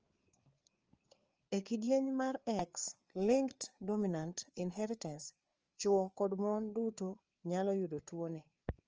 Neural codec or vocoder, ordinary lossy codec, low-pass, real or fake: codec, 16 kHz, 4 kbps, FreqCodec, larger model; Opus, 24 kbps; 7.2 kHz; fake